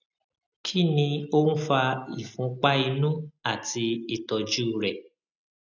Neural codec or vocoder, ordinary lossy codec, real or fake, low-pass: none; none; real; 7.2 kHz